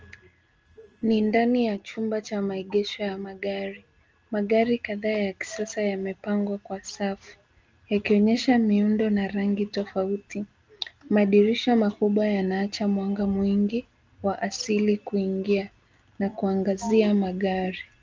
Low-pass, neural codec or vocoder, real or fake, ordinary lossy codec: 7.2 kHz; none; real; Opus, 24 kbps